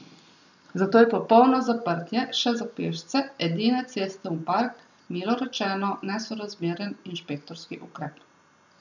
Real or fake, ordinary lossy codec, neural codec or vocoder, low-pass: real; none; none; 7.2 kHz